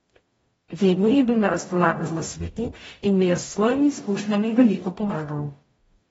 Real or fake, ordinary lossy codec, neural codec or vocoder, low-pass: fake; AAC, 24 kbps; codec, 44.1 kHz, 0.9 kbps, DAC; 19.8 kHz